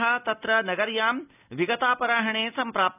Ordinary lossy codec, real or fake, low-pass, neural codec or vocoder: none; real; 3.6 kHz; none